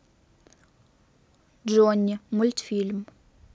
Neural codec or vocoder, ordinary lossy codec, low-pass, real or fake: none; none; none; real